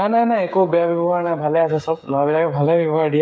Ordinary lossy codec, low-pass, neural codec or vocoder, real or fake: none; none; codec, 16 kHz, 16 kbps, FreqCodec, smaller model; fake